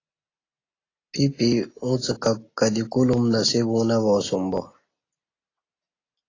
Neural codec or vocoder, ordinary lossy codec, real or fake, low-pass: none; AAC, 32 kbps; real; 7.2 kHz